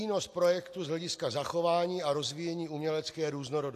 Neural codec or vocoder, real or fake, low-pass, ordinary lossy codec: none; real; 14.4 kHz; AAC, 64 kbps